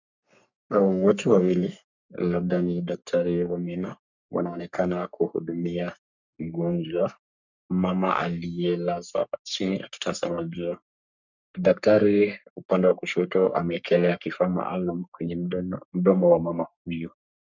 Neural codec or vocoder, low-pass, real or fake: codec, 44.1 kHz, 3.4 kbps, Pupu-Codec; 7.2 kHz; fake